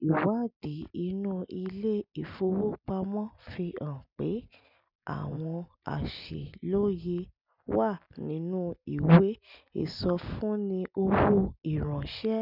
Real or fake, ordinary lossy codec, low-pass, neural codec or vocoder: real; none; 5.4 kHz; none